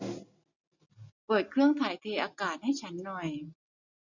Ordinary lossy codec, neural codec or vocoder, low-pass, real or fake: none; none; 7.2 kHz; real